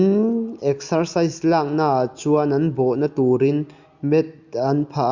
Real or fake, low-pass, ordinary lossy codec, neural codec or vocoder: real; 7.2 kHz; Opus, 64 kbps; none